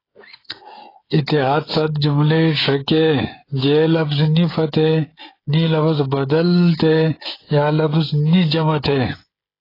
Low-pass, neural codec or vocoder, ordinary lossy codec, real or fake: 5.4 kHz; codec, 16 kHz, 16 kbps, FreqCodec, smaller model; AAC, 24 kbps; fake